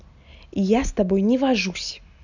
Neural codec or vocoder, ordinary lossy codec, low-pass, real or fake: none; none; 7.2 kHz; real